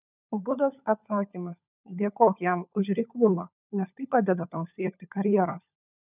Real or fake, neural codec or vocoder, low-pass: fake; codec, 16 kHz, 16 kbps, FunCodec, trained on LibriTTS, 50 frames a second; 3.6 kHz